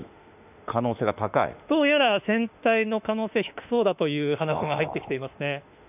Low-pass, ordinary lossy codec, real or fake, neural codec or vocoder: 3.6 kHz; none; fake; autoencoder, 48 kHz, 32 numbers a frame, DAC-VAE, trained on Japanese speech